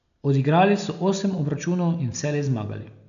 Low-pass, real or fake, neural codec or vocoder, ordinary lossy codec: 7.2 kHz; real; none; none